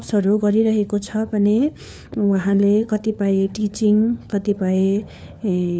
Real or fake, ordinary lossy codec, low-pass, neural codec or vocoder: fake; none; none; codec, 16 kHz, 4 kbps, FunCodec, trained on LibriTTS, 50 frames a second